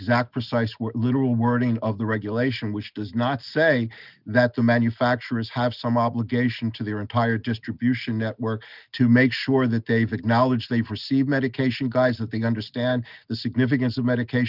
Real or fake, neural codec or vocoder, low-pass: real; none; 5.4 kHz